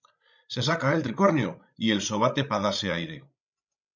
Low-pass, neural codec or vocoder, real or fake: 7.2 kHz; codec, 16 kHz, 16 kbps, FreqCodec, larger model; fake